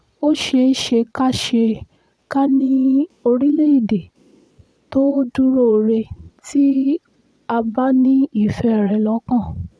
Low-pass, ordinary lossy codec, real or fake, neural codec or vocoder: none; none; fake; vocoder, 22.05 kHz, 80 mel bands, Vocos